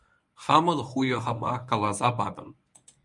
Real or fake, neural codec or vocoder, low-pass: fake; codec, 24 kHz, 0.9 kbps, WavTokenizer, medium speech release version 1; 10.8 kHz